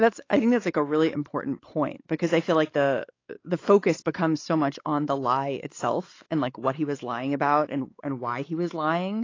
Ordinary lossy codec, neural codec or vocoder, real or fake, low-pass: AAC, 32 kbps; codec, 16 kHz, 4 kbps, X-Codec, WavLM features, trained on Multilingual LibriSpeech; fake; 7.2 kHz